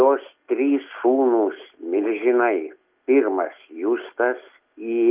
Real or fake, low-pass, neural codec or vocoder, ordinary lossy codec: real; 3.6 kHz; none; Opus, 24 kbps